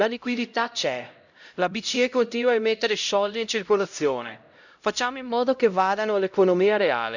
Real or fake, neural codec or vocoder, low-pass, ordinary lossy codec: fake; codec, 16 kHz, 0.5 kbps, X-Codec, HuBERT features, trained on LibriSpeech; 7.2 kHz; none